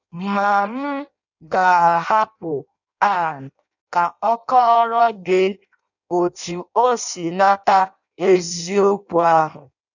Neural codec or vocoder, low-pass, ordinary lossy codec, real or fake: codec, 16 kHz in and 24 kHz out, 0.6 kbps, FireRedTTS-2 codec; 7.2 kHz; none; fake